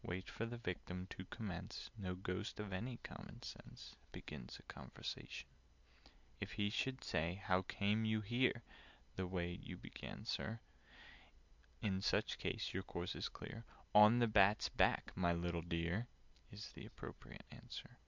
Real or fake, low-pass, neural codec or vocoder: real; 7.2 kHz; none